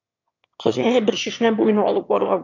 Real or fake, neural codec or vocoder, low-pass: fake; autoencoder, 22.05 kHz, a latent of 192 numbers a frame, VITS, trained on one speaker; 7.2 kHz